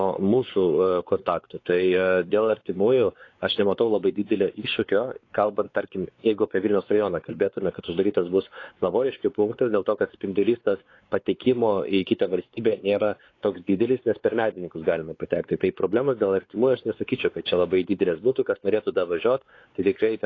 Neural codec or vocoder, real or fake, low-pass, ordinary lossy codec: codec, 16 kHz, 4 kbps, X-Codec, WavLM features, trained on Multilingual LibriSpeech; fake; 7.2 kHz; AAC, 32 kbps